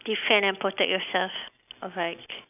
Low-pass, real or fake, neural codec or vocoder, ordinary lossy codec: 3.6 kHz; real; none; none